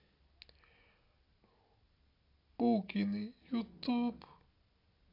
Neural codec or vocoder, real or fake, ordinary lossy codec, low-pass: none; real; AAC, 32 kbps; 5.4 kHz